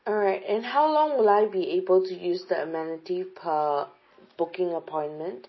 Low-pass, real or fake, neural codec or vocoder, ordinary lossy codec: 7.2 kHz; real; none; MP3, 24 kbps